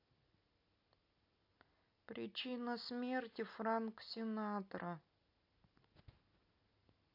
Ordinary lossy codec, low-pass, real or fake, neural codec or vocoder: AAC, 32 kbps; 5.4 kHz; real; none